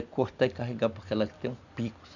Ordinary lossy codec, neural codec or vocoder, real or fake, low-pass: none; none; real; 7.2 kHz